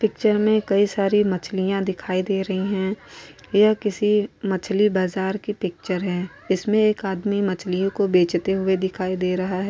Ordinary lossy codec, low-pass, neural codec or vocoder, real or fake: none; none; none; real